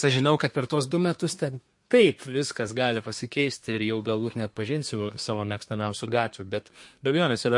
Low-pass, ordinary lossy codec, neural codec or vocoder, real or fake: 10.8 kHz; MP3, 48 kbps; codec, 24 kHz, 1 kbps, SNAC; fake